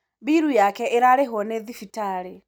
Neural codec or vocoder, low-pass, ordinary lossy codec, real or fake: none; none; none; real